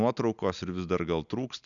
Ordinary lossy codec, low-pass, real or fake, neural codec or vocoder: MP3, 96 kbps; 7.2 kHz; real; none